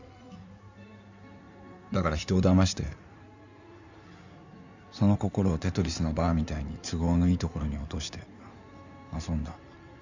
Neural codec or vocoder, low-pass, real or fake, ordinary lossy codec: codec, 16 kHz in and 24 kHz out, 2.2 kbps, FireRedTTS-2 codec; 7.2 kHz; fake; none